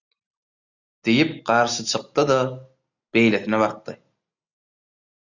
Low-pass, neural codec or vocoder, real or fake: 7.2 kHz; none; real